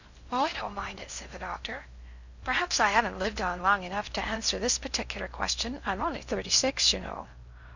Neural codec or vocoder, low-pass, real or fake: codec, 16 kHz in and 24 kHz out, 0.6 kbps, FocalCodec, streaming, 2048 codes; 7.2 kHz; fake